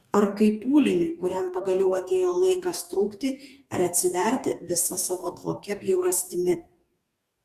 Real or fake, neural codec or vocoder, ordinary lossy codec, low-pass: fake; codec, 44.1 kHz, 2.6 kbps, DAC; Opus, 64 kbps; 14.4 kHz